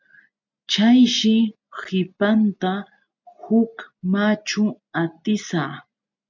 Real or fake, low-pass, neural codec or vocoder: real; 7.2 kHz; none